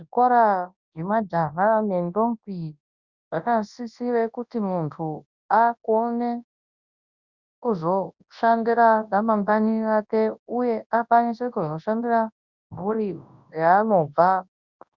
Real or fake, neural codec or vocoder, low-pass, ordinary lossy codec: fake; codec, 24 kHz, 0.9 kbps, WavTokenizer, large speech release; 7.2 kHz; Opus, 64 kbps